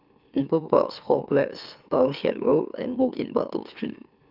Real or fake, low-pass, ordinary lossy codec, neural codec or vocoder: fake; 5.4 kHz; Opus, 24 kbps; autoencoder, 44.1 kHz, a latent of 192 numbers a frame, MeloTTS